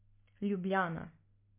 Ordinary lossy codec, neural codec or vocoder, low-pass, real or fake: MP3, 24 kbps; none; 3.6 kHz; real